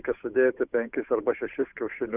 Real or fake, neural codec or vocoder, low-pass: fake; codec, 16 kHz, 8 kbps, FunCodec, trained on Chinese and English, 25 frames a second; 3.6 kHz